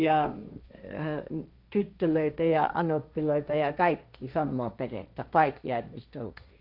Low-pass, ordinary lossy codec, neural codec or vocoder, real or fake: 5.4 kHz; none; codec, 16 kHz, 1.1 kbps, Voila-Tokenizer; fake